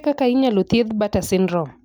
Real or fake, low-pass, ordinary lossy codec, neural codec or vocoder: real; none; none; none